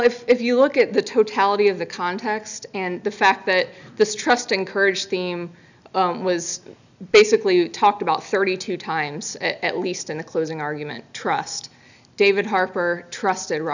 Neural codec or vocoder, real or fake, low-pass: none; real; 7.2 kHz